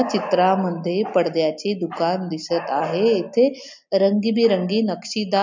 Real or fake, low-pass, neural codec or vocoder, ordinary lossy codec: real; 7.2 kHz; none; MP3, 64 kbps